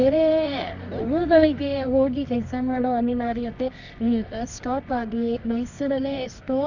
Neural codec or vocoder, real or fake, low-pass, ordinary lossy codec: codec, 24 kHz, 0.9 kbps, WavTokenizer, medium music audio release; fake; 7.2 kHz; none